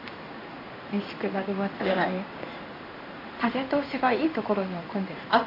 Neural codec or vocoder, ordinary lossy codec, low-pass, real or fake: codec, 16 kHz in and 24 kHz out, 1 kbps, XY-Tokenizer; none; 5.4 kHz; fake